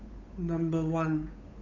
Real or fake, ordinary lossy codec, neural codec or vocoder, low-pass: fake; none; codec, 16 kHz, 8 kbps, FunCodec, trained on Chinese and English, 25 frames a second; 7.2 kHz